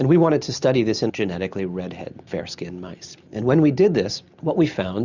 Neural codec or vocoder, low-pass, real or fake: none; 7.2 kHz; real